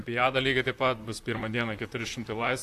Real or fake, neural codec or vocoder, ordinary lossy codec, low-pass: fake; vocoder, 44.1 kHz, 128 mel bands, Pupu-Vocoder; AAC, 64 kbps; 14.4 kHz